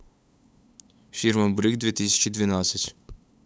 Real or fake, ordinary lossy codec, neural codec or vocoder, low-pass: fake; none; codec, 16 kHz, 8 kbps, FunCodec, trained on LibriTTS, 25 frames a second; none